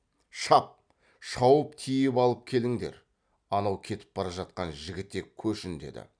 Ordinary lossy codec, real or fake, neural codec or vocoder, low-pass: none; real; none; 9.9 kHz